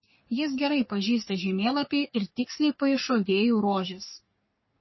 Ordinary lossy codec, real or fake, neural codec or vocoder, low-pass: MP3, 24 kbps; fake; codec, 44.1 kHz, 3.4 kbps, Pupu-Codec; 7.2 kHz